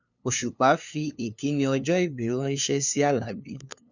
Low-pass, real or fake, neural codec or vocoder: 7.2 kHz; fake; codec, 16 kHz, 2 kbps, FunCodec, trained on LibriTTS, 25 frames a second